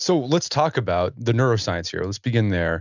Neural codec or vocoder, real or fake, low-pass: none; real; 7.2 kHz